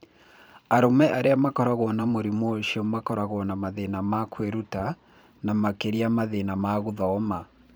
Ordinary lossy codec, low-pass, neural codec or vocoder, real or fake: none; none; vocoder, 44.1 kHz, 128 mel bands every 512 samples, BigVGAN v2; fake